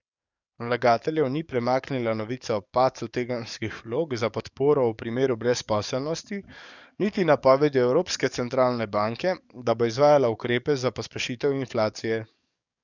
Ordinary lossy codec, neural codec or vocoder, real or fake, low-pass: none; codec, 44.1 kHz, 7.8 kbps, DAC; fake; 7.2 kHz